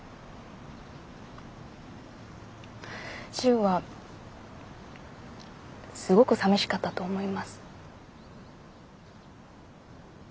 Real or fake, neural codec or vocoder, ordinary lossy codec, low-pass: real; none; none; none